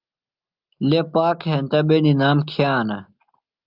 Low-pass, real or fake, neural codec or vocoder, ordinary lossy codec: 5.4 kHz; real; none; Opus, 24 kbps